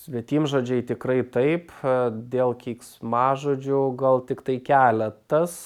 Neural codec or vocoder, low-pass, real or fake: none; 19.8 kHz; real